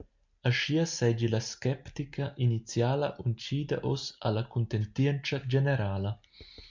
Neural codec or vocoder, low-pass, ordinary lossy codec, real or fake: none; 7.2 kHz; MP3, 64 kbps; real